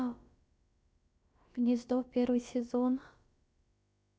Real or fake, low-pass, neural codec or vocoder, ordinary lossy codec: fake; none; codec, 16 kHz, about 1 kbps, DyCAST, with the encoder's durations; none